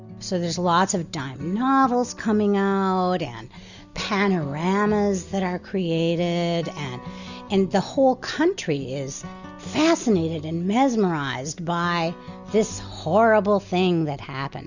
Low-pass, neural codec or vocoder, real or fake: 7.2 kHz; none; real